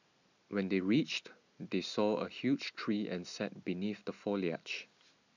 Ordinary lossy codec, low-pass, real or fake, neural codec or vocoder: none; 7.2 kHz; real; none